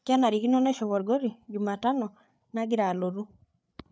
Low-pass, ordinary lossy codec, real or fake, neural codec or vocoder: none; none; fake; codec, 16 kHz, 8 kbps, FreqCodec, larger model